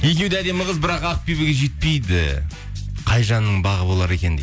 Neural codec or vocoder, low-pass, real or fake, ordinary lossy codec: none; none; real; none